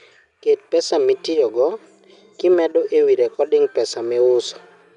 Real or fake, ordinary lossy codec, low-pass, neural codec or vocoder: real; none; 10.8 kHz; none